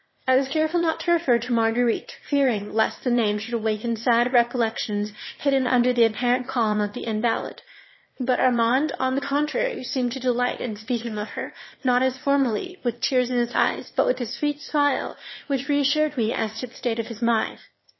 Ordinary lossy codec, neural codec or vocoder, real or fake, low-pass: MP3, 24 kbps; autoencoder, 22.05 kHz, a latent of 192 numbers a frame, VITS, trained on one speaker; fake; 7.2 kHz